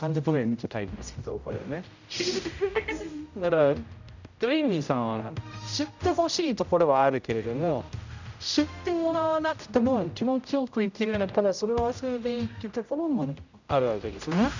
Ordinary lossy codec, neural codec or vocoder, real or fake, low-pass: none; codec, 16 kHz, 0.5 kbps, X-Codec, HuBERT features, trained on general audio; fake; 7.2 kHz